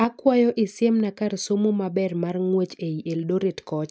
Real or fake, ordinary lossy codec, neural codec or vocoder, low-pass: real; none; none; none